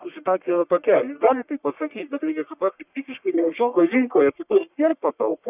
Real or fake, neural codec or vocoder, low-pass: fake; codec, 44.1 kHz, 1.7 kbps, Pupu-Codec; 3.6 kHz